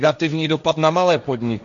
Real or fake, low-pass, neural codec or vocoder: fake; 7.2 kHz; codec, 16 kHz, 1.1 kbps, Voila-Tokenizer